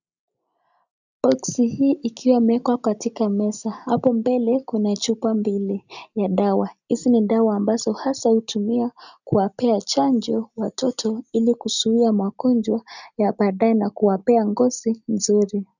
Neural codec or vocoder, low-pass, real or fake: none; 7.2 kHz; real